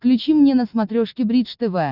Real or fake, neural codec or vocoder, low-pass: real; none; 5.4 kHz